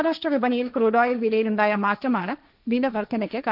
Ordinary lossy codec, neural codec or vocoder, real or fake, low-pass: none; codec, 16 kHz, 1.1 kbps, Voila-Tokenizer; fake; 5.4 kHz